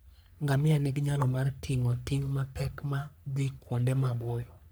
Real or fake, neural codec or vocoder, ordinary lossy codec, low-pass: fake; codec, 44.1 kHz, 3.4 kbps, Pupu-Codec; none; none